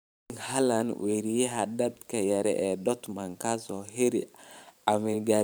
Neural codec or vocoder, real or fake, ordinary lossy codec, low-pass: vocoder, 44.1 kHz, 128 mel bands every 512 samples, BigVGAN v2; fake; none; none